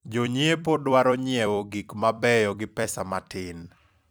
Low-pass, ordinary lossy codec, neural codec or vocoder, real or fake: none; none; vocoder, 44.1 kHz, 128 mel bands every 256 samples, BigVGAN v2; fake